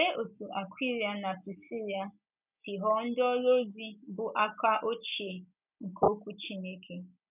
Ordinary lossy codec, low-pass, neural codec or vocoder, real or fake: none; 3.6 kHz; none; real